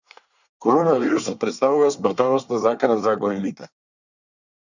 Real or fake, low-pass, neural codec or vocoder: fake; 7.2 kHz; codec, 24 kHz, 1 kbps, SNAC